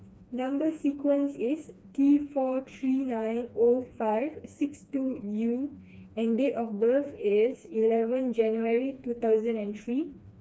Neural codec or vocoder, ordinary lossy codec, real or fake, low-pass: codec, 16 kHz, 2 kbps, FreqCodec, smaller model; none; fake; none